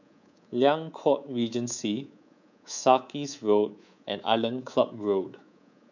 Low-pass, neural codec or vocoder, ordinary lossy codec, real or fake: 7.2 kHz; codec, 24 kHz, 3.1 kbps, DualCodec; none; fake